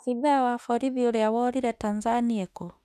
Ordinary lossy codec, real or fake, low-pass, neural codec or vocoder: none; fake; 14.4 kHz; autoencoder, 48 kHz, 32 numbers a frame, DAC-VAE, trained on Japanese speech